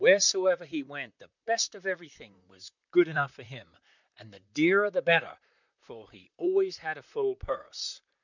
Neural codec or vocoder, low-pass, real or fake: vocoder, 44.1 kHz, 128 mel bands, Pupu-Vocoder; 7.2 kHz; fake